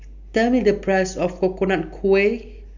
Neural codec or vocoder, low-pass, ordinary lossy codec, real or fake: none; 7.2 kHz; none; real